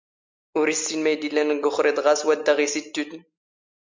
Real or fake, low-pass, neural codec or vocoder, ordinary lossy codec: real; 7.2 kHz; none; MP3, 64 kbps